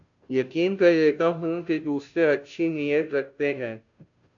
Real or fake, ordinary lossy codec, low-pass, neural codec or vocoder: fake; AAC, 64 kbps; 7.2 kHz; codec, 16 kHz, 0.5 kbps, FunCodec, trained on Chinese and English, 25 frames a second